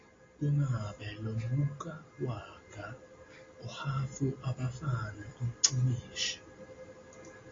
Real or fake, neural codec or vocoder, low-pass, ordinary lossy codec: real; none; 7.2 kHz; AAC, 32 kbps